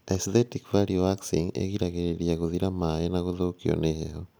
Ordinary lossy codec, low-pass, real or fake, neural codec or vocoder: none; none; real; none